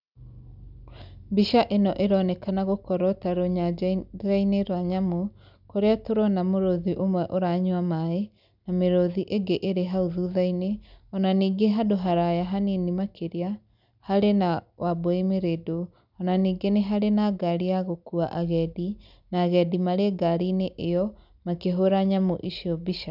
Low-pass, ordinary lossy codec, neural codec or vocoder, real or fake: 5.4 kHz; none; none; real